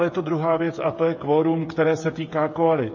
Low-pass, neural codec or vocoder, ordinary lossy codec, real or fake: 7.2 kHz; codec, 16 kHz, 8 kbps, FreqCodec, smaller model; MP3, 32 kbps; fake